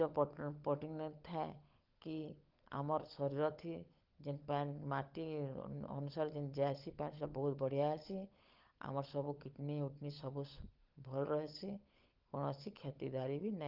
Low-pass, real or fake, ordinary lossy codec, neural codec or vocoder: 5.4 kHz; fake; Opus, 32 kbps; codec, 16 kHz, 16 kbps, FunCodec, trained on LibriTTS, 50 frames a second